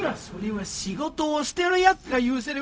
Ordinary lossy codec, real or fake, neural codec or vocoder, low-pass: none; fake; codec, 16 kHz, 0.4 kbps, LongCat-Audio-Codec; none